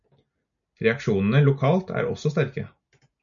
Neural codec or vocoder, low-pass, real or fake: none; 7.2 kHz; real